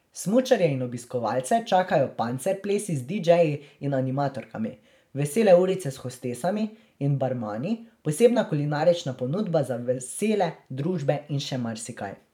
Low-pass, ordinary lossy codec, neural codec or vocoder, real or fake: 19.8 kHz; none; vocoder, 44.1 kHz, 128 mel bands every 512 samples, BigVGAN v2; fake